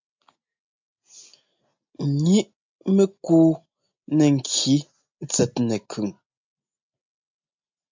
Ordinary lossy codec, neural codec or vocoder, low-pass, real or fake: MP3, 64 kbps; none; 7.2 kHz; real